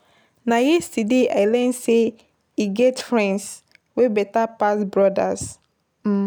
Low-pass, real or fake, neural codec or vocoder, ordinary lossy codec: none; real; none; none